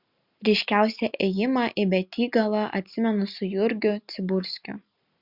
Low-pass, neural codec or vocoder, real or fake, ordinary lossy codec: 5.4 kHz; none; real; Opus, 64 kbps